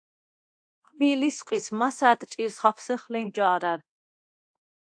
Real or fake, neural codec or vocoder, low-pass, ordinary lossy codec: fake; codec, 24 kHz, 0.9 kbps, DualCodec; 9.9 kHz; AAC, 64 kbps